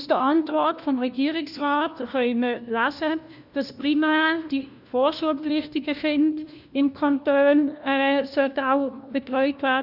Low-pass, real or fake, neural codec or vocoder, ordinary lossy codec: 5.4 kHz; fake; codec, 16 kHz, 1 kbps, FunCodec, trained on LibriTTS, 50 frames a second; none